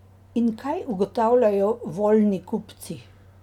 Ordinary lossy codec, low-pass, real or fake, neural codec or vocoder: none; 19.8 kHz; real; none